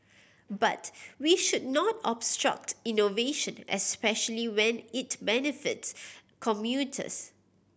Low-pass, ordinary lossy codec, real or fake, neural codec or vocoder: none; none; real; none